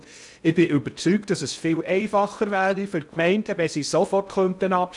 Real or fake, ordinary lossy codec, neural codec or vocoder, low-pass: fake; none; codec, 16 kHz in and 24 kHz out, 0.6 kbps, FocalCodec, streaming, 2048 codes; 10.8 kHz